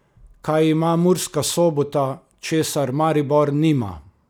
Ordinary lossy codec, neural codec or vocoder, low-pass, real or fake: none; none; none; real